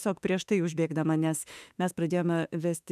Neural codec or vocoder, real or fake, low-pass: autoencoder, 48 kHz, 32 numbers a frame, DAC-VAE, trained on Japanese speech; fake; 14.4 kHz